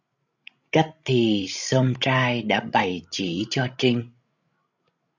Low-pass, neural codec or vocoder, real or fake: 7.2 kHz; codec, 16 kHz, 16 kbps, FreqCodec, larger model; fake